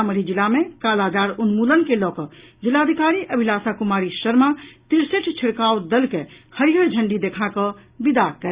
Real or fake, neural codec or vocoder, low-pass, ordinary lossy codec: real; none; 3.6 kHz; Opus, 64 kbps